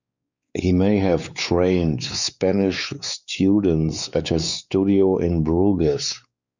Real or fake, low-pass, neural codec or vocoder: fake; 7.2 kHz; codec, 16 kHz, 4 kbps, X-Codec, WavLM features, trained on Multilingual LibriSpeech